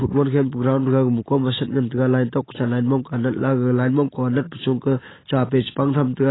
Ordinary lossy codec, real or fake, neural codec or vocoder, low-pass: AAC, 16 kbps; real; none; 7.2 kHz